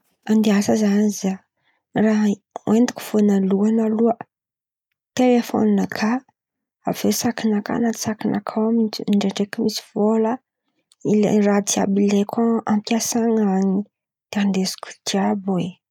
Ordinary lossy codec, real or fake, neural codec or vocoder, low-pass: none; real; none; 19.8 kHz